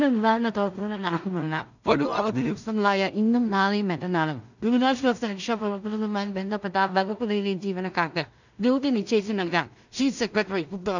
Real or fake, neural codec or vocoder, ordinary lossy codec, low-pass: fake; codec, 16 kHz in and 24 kHz out, 0.4 kbps, LongCat-Audio-Codec, two codebook decoder; none; 7.2 kHz